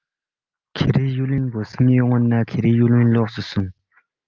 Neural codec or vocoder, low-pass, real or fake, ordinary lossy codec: none; 7.2 kHz; real; Opus, 24 kbps